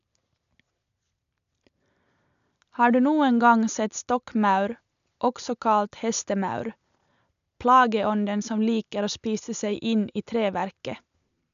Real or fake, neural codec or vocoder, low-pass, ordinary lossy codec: real; none; 7.2 kHz; none